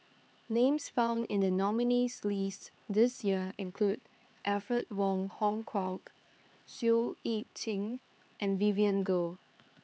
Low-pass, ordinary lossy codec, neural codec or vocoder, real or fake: none; none; codec, 16 kHz, 4 kbps, X-Codec, HuBERT features, trained on LibriSpeech; fake